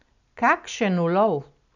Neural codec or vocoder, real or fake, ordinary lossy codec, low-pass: none; real; none; 7.2 kHz